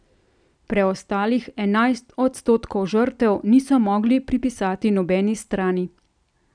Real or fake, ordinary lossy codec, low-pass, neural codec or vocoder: real; none; 9.9 kHz; none